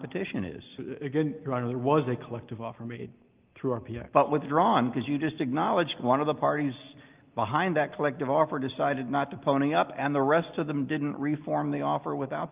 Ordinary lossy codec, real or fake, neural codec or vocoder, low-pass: Opus, 32 kbps; real; none; 3.6 kHz